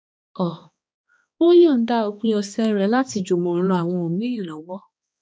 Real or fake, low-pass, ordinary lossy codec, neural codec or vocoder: fake; none; none; codec, 16 kHz, 2 kbps, X-Codec, HuBERT features, trained on balanced general audio